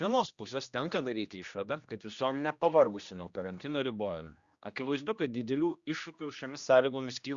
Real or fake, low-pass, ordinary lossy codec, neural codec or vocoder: fake; 7.2 kHz; Opus, 64 kbps; codec, 16 kHz, 1 kbps, X-Codec, HuBERT features, trained on general audio